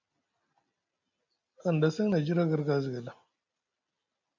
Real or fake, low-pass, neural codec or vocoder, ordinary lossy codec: real; 7.2 kHz; none; MP3, 64 kbps